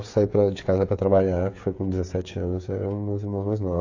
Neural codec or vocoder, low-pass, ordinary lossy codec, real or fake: codec, 16 kHz, 8 kbps, FreqCodec, smaller model; 7.2 kHz; AAC, 48 kbps; fake